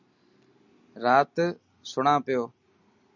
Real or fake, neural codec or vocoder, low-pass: real; none; 7.2 kHz